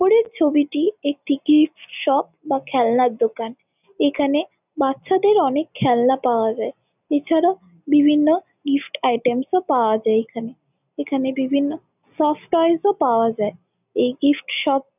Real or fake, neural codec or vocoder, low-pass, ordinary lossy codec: real; none; 3.6 kHz; none